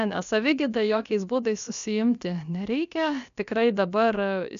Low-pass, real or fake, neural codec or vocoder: 7.2 kHz; fake; codec, 16 kHz, about 1 kbps, DyCAST, with the encoder's durations